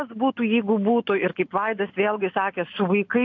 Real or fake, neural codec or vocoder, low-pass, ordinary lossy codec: real; none; 7.2 kHz; AAC, 48 kbps